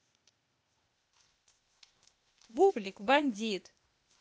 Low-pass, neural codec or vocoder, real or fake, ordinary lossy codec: none; codec, 16 kHz, 0.8 kbps, ZipCodec; fake; none